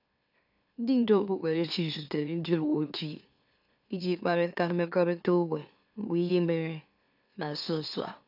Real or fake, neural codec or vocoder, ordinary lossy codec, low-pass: fake; autoencoder, 44.1 kHz, a latent of 192 numbers a frame, MeloTTS; AAC, 48 kbps; 5.4 kHz